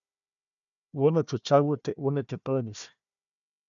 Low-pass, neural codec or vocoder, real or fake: 7.2 kHz; codec, 16 kHz, 1 kbps, FunCodec, trained on Chinese and English, 50 frames a second; fake